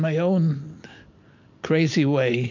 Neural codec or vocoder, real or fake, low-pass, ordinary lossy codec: none; real; 7.2 kHz; MP3, 48 kbps